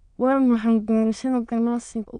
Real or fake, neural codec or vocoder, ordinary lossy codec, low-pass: fake; autoencoder, 22.05 kHz, a latent of 192 numbers a frame, VITS, trained on many speakers; none; 9.9 kHz